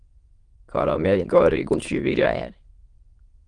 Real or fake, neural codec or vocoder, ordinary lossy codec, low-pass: fake; autoencoder, 22.05 kHz, a latent of 192 numbers a frame, VITS, trained on many speakers; Opus, 24 kbps; 9.9 kHz